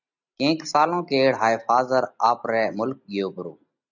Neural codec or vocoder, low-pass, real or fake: none; 7.2 kHz; real